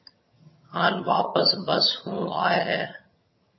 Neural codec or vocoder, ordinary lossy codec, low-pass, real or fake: vocoder, 22.05 kHz, 80 mel bands, HiFi-GAN; MP3, 24 kbps; 7.2 kHz; fake